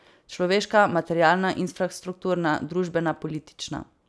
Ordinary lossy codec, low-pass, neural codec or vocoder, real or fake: none; none; none; real